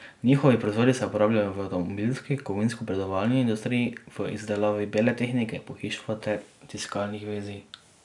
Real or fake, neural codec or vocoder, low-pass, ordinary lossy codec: real; none; 10.8 kHz; none